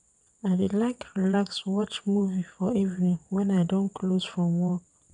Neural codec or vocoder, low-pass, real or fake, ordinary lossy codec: vocoder, 22.05 kHz, 80 mel bands, WaveNeXt; 9.9 kHz; fake; none